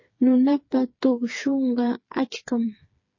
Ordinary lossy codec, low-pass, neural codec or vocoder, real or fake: MP3, 32 kbps; 7.2 kHz; codec, 16 kHz, 4 kbps, FreqCodec, smaller model; fake